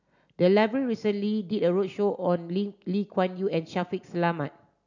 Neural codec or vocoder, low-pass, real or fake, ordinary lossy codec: none; 7.2 kHz; real; none